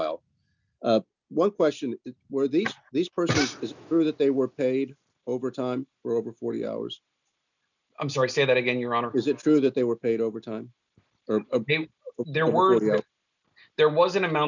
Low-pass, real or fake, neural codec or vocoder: 7.2 kHz; real; none